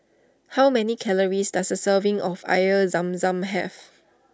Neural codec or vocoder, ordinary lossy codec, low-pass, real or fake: none; none; none; real